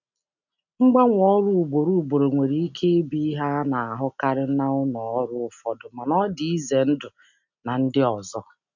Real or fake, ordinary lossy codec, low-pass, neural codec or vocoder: real; none; 7.2 kHz; none